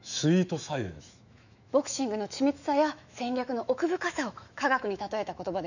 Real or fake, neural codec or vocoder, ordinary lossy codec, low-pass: fake; autoencoder, 48 kHz, 128 numbers a frame, DAC-VAE, trained on Japanese speech; none; 7.2 kHz